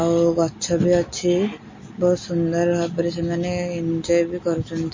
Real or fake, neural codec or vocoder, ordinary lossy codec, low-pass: real; none; MP3, 32 kbps; 7.2 kHz